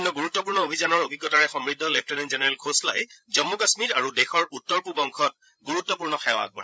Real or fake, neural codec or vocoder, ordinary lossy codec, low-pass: fake; codec, 16 kHz, 16 kbps, FreqCodec, larger model; none; none